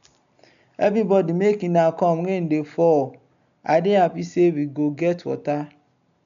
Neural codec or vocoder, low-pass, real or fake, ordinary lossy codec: none; 7.2 kHz; real; none